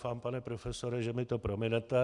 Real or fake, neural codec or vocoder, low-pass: real; none; 10.8 kHz